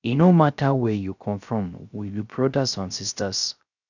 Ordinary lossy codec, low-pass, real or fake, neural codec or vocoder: none; 7.2 kHz; fake; codec, 16 kHz, 0.3 kbps, FocalCodec